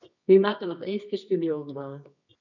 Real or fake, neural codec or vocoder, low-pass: fake; codec, 24 kHz, 0.9 kbps, WavTokenizer, medium music audio release; 7.2 kHz